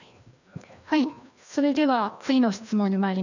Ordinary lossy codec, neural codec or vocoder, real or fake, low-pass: none; codec, 16 kHz, 1 kbps, FreqCodec, larger model; fake; 7.2 kHz